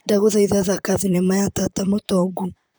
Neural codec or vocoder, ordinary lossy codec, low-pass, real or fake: vocoder, 44.1 kHz, 128 mel bands, Pupu-Vocoder; none; none; fake